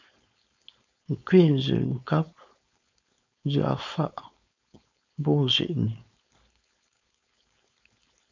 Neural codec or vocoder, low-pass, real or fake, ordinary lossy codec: codec, 16 kHz, 4.8 kbps, FACodec; 7.2 kHz; fake; MP3, 64 kbps